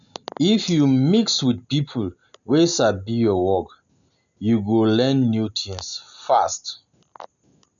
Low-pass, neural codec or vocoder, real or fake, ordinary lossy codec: 7.2 kHz; none; real; none